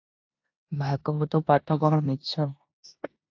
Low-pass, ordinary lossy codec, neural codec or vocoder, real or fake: 7.2 kHz; AAC, 48 kbps; codec, 16 kHz in and 24 kHz out, 0.9 kbps, LongCat-Audio-Codec, four codebook decoder; fake